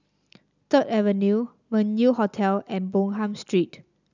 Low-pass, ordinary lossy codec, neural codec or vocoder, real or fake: 7.2 kHz; none; none; real